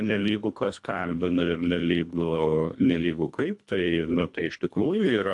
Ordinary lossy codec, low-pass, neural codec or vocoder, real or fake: AAC, 64 kbps; 10.8 kHz; codec, 24 kHz, 1.5 kbps, HILCodec; fake